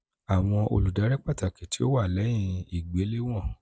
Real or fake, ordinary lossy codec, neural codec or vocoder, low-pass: real; none; none; none